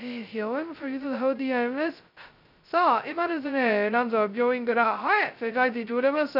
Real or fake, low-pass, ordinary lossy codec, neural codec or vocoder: fake; 5.4 kHz; none; codec, 16 kHz, 0.2 kbps, FocalCodec